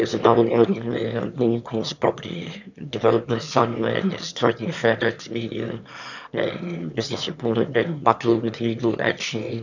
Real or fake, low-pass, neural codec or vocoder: fake; 7.2 kHz; autoencoder, 22.05 kHz, a latent of 192 numbers a frame, VITS, trained on one speaker